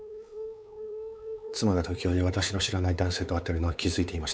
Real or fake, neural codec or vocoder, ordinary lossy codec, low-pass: fake; codec, 16 kHz, 4 kbps, X-Codec, WavLM features, trained on Multilingual LibriSpeech; none; none